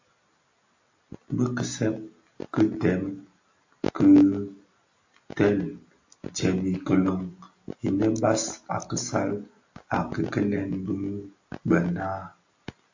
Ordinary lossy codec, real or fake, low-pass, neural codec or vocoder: AAC, 48 kbps; real; 7.2 kHz; none